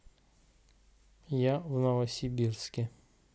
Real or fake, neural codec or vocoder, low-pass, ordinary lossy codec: real; none; none; none